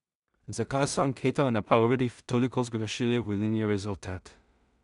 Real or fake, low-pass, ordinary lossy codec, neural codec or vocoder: fake; 10.8 kHz; none; codec, 16 kHz in and 24 kHz out, 0.4 kbps, LongCat-Audio-Codec, two codebook decoder